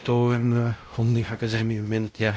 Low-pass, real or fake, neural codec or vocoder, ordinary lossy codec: none; fake; codec, 16 kHz, 0.5 kbps, X-Codec, WavLM features, trained on Multilingual LibriSpeech; none